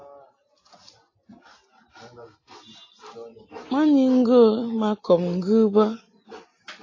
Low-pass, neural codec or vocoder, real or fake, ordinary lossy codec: 7.2 kHz; none; real; AAC, 32 kbps